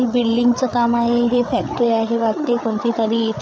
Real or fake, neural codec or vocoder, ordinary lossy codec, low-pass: fake; codec, 16 kHz, 8 kbps, FreqCodec, larger model; none; none